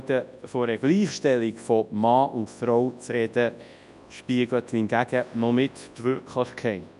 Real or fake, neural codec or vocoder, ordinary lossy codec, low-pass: fake; codec, 24 kHz, 0.9 kbps, WavTokenizer, large speech release; none; 10.8 kHz